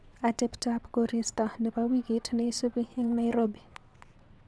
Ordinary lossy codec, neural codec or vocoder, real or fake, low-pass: none; vocoder, 22.05 kHz, 80 mel bands, WaveNeXt; fake; none